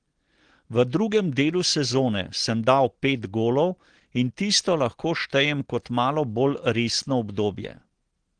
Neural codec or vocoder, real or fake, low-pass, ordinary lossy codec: none; real; 9.9 kHz; Opus, 16 kbps